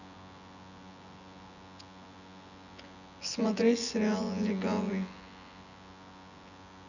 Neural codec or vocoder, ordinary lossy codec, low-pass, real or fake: vocoder, 24 kHz, 100 mel bands, Vocos; none; 7.2 kHz; fake